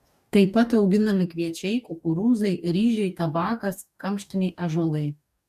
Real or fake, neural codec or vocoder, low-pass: fake; codec, 44.1 kHz, 2.6 kbps, DAC; 14.4 kHz